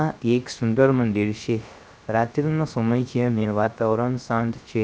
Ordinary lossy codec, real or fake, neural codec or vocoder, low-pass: none; fake; codec, 16 kHz, 0.3 kbps, FocalCodec; none